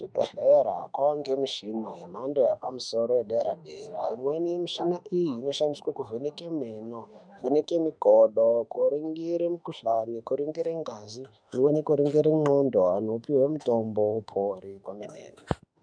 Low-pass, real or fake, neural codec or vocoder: 9.9 kHz; fake; autoencoder, 48 kHz, 32 numbers a frame, DAC-VAE, trained on Japanese speech